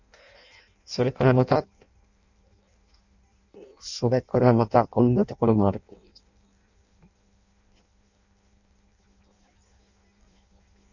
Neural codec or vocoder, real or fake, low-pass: codec, 16 kHz in and 24 kHz out, 0.6 kbps, FireRedTTS-2 codec; fake; 7.2 kHz